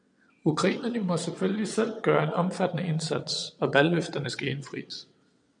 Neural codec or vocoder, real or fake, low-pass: vocoder, 22.05 kHz, 80 mel bands, WaveNeXt; fake; 9.9 kHz